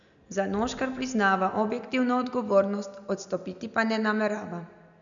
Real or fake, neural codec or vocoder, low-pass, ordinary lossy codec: real; none; 7.2 kHz; none